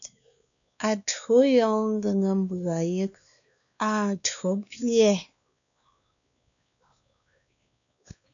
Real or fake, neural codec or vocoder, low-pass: fake; codec, 16 kHz, 2 kbps, X-Codec, WavLM features, trained on Multilingual LibriSpeech; 7.2 kHz